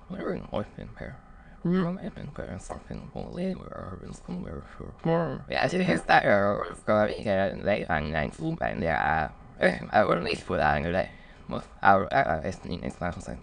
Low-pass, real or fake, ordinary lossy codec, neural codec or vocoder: 9.9 kHz; fake; none; autoencoder, 22.05 kHz, a latent of 192 numbers a frame, VITS, trained on many speakers